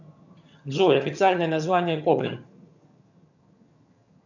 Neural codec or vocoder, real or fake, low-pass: vocoder, 22.05 kHz, 80 mel bands, HiFi-GAN; fake; 7.2 kHz